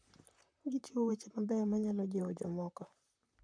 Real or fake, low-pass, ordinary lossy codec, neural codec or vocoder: fake; 9.9 kHz; none; vocoder, 44.1 kHz, 128 mel bands, Pupu-Vocoder